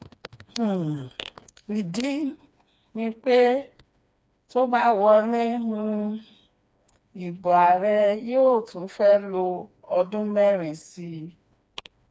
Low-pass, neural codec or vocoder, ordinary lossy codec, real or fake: none; codec, 16 kHz, 2 kbps, FreqCodec, smaller model; none; fake